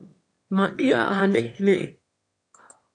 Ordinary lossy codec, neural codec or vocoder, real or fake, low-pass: MP3, 64 kbps; autoencoder, 22.05 kHz, a latent of 192 numbers a frame, VITS, trained on one speaker; fake; 9.9 kHz